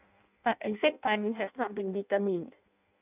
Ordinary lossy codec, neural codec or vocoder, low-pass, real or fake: none; codec, 16 kHz in and 24 kHz out, 0.6 kbps, FireRedTTS-2 codec; 3.6 kHz; fake